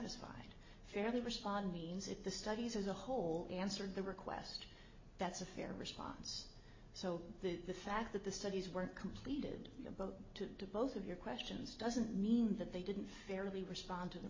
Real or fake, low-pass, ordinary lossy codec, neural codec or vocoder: real; 7.2 kHz; MP3, 32 kbps; none